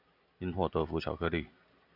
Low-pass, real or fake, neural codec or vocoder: 5.4 kHz; real; none